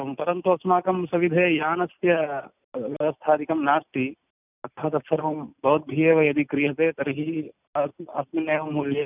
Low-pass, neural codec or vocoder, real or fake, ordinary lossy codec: 3.6 kHz; none; real; none